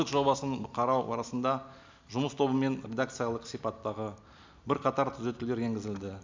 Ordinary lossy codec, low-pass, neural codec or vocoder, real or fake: MP3, 64 kbps; 7.2 kHz; none; real